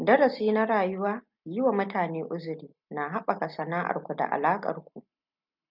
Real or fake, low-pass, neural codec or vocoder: real; 5.4 kHz; none